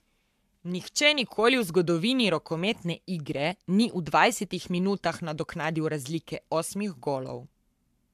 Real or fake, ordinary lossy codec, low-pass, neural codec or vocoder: fake; AAC, 96 kbps; 14.4 kHz; codec, 44.1 kHz, 7.8 kbps, Pupu-Codec